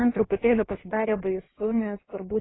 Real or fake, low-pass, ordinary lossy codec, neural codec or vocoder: fake; 7.2 kHz; AAC, 16 kbps; codec, 16 kHz in and 24 kHz out, 1.1 kbps, FireRedTTS-2 codec